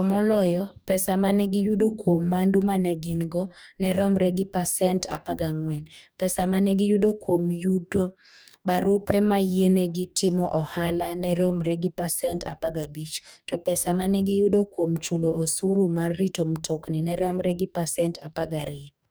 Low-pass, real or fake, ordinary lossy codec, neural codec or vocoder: none; fake; none; codec, 44.1 kHz, 2.6 kbps, DAC